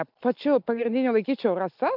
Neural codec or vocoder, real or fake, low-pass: none; real; 5.4 kHz